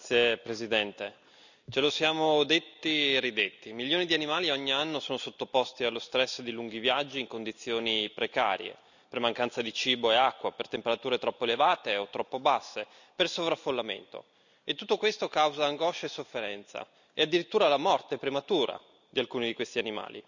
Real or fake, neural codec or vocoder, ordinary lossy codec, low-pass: real; none; none; 7.2 kHz